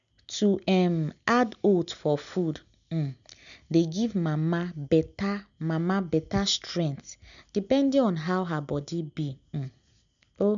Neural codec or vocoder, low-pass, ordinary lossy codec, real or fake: none; 7.2 kHz; none; real